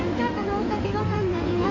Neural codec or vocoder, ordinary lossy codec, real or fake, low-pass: codec, 16 kHz in and 24 kHz out, 2.2 kbps, FireRedTTS-2 codec; none; fake; 7.2 kHz